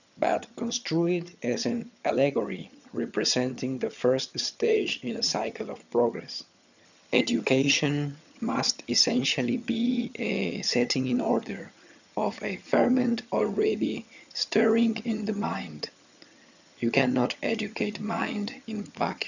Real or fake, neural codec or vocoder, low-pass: fake; vocoder, 22.05 kHz, 80 mel bands, HiFi-GAN; 7.2 kHz